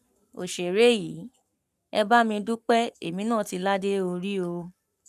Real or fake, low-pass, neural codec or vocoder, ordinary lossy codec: fake; 14.4 kHz; codec, 44.1 kHz, 7.8 kbps, Pupu-Codec; none